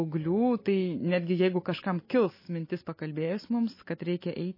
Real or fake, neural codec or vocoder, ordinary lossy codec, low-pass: real; none; MP3, 24 kbps; 5.4 kHz